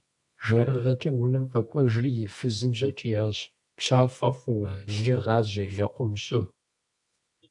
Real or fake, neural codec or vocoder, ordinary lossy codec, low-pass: fake; codec, 24 kHz, 0.9 kbps, WavTokenizer, medium music audio release; AAC, 64 kbps; 10.8 kHz